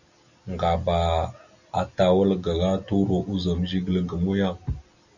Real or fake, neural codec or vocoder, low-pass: real; none; 7.2 kHz